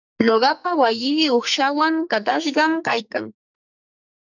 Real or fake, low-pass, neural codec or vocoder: fake; 7.2 kHz; codec, 44.1 kHz, 2.6 kbps, SNAC